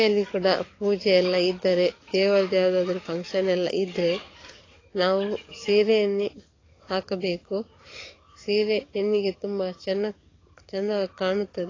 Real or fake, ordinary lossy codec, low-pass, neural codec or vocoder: fake; AAC, 32 kbps; 7.2 kHz; codec, 44.1 kHz, 7.8 kbps, DAC